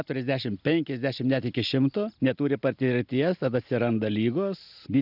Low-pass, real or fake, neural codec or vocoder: 5.4 kHz; real; none